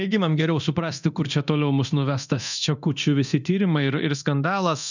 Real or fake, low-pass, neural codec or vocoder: fake; 7.2 kHz; codec, 24 kHz, 0.9 kbps, DualCodec